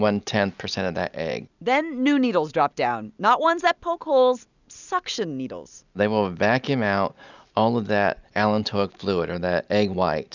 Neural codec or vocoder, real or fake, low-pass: none; real; 7.2 kHz